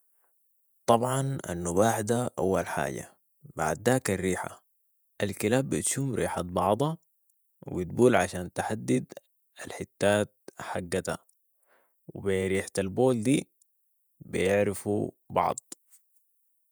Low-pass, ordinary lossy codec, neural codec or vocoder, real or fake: none; none; vocoder, 48 kHz, 128 mel bands, Vocos; fake